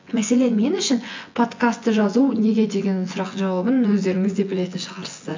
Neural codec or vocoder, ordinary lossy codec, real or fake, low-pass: vocoder, 24 kHz, 100 mel bands, Vocos; MP3, 48 kbps; fake; 7.2 kHz